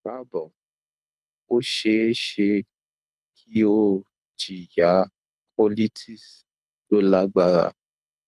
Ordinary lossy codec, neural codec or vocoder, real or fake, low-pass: none; codec, 24 kHz, 6 kbps, HILCodec; fake; none